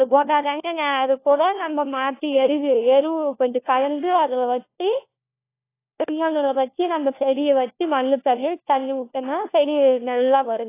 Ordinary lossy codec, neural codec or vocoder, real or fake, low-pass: AAC, 24 kbps; codec, 16 kHz, 1 kbps, FunCodec, trained on LibriTTS, 50 frames a second; fake; 3.6 kHz